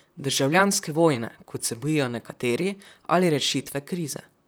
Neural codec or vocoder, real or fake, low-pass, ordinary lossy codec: vocoder, 44.1 kHz, 128 mel bands, Pupu-Vocoder; fake; none; none